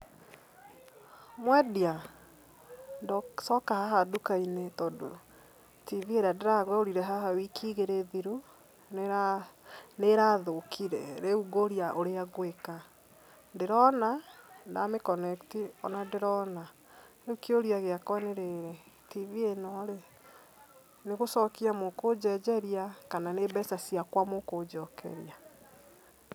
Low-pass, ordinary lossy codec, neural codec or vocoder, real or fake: none; none; none; real